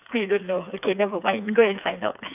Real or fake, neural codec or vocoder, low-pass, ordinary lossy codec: fake; codec, 16 kHz, 4 kbps, FreqCodec, smaller model; 3.6 kHz; none